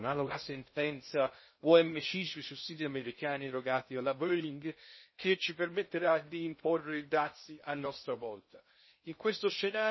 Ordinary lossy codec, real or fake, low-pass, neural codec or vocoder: MP3, 24 kbps; fake; 7.2 kHz; codec, 16 kHz in and 24 kHz out, 0.6 kbps, FocalCodec, streaming, 2048 codes